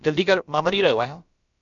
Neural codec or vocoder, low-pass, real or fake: codec, 16 kHz, about 1 kbps, DyCAST, with the encoder's durations; 7.2 kHz; fake